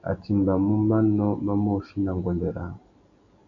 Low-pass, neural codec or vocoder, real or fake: 7.2 kHz; none; real